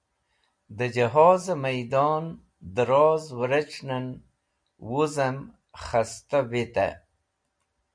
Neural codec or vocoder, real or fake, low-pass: none; real; 9.9 kHz